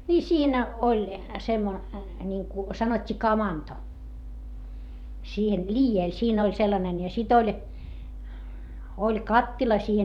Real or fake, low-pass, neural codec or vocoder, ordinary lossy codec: fake; 19.8 kHz; vocoder, 44.1 kHz, 128 mel bands every 512 samples, BigVGAN v2; none